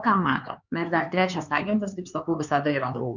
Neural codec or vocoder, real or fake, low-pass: codec, 16 kHz, 4 kbps, X-Codec, HuBERT features, trained on LibriSpeech; fake; 7.2 kHz